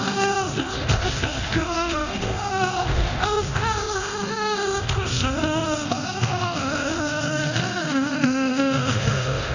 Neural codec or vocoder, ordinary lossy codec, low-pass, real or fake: codec, 24 kHz, 0.9 kbps, DualCodec; none; 7.2 kHz; fake